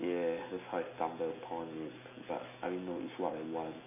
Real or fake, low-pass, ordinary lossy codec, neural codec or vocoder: real; 3.6 kHz; none; none